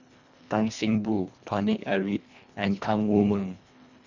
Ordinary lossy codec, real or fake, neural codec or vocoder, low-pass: none; fake; codec, 24 kHz, 1.5 kbps, HILCodec; 7.2 kHz